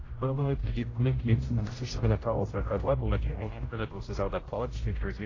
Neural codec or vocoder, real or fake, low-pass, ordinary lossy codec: codec, 16 kHz, 0.5 kbps, X-Codec, HuBERT features, trained on general audio; fake; 7.2 kHz; AAC, 32 kbps